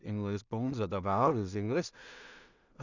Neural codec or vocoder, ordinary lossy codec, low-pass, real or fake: codec, 16 kHz in and 24 kHz out, 0.4 kbps, LongCat-Audio-Codec, two codebook decoder; none; 7.2 kHz; fake